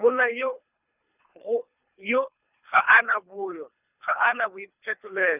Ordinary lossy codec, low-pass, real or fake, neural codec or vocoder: none; 3.6 kHz; fake; codec, 24 kHz, 3 kbps, HILCodec